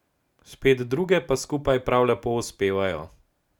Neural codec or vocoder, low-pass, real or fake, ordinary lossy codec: none; 19.8 kHz; real; none